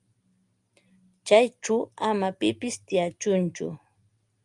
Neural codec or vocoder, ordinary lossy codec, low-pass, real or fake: none; Opus, 32 kbps; 10.8 kHz; real